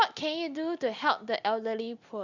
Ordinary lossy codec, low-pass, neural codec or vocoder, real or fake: Opus, 64 kbps; 7.2 kHz; none; real